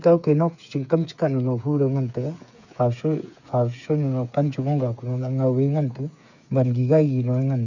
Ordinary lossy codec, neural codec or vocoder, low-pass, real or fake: none; codec, 16 kHz, 8 kbps, FreqCodec, smaller model; 7.2 kHz; fake